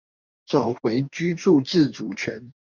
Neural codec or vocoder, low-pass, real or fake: codec, 44.1 kHz, 2.6 kbps, DAC; 7.2 kHz; fake